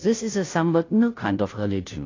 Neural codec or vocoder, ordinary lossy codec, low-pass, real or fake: codec, 16 kHz, 0.5 kbps, FunCodec, trained on Chinese and English, 25 frames a second; AAC, 32 kbps; 7.2 kHz; fake